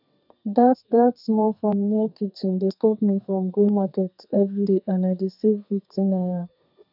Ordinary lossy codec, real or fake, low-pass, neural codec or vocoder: none; fake; 5.4 kHz; codec, 32 kHz, 1.9 kbps, SNAC